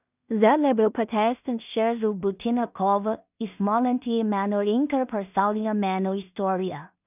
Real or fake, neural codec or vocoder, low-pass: fake; codec, 16 kHz in and 24 kHz out, 0.4 kbps, LongCat-Audio-Codec, two codebook decoder; 3.6 kHz